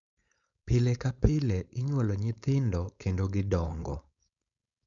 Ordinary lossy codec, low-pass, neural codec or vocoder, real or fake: none; 7.2 kHz; codec, 16 kHz, 4.8 kbps, FACodec; fake